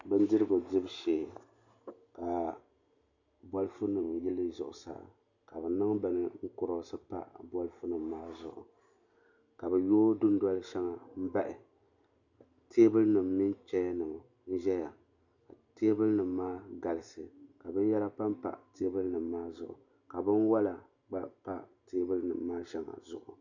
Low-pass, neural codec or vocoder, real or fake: 7.2 kHz; none; real